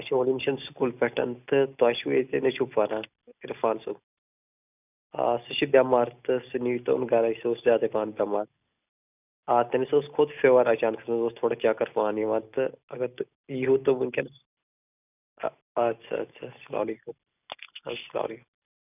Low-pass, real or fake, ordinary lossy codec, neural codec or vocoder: 3.6 kHz; real; none; none